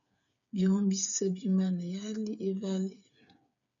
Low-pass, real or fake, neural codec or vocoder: 7.2 kHz; fake; codec, 16 kHz, 8 kbps, FreqCodec, smaller model